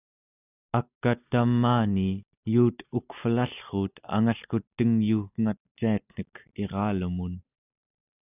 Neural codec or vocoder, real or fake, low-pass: none; real; 3.6 kHz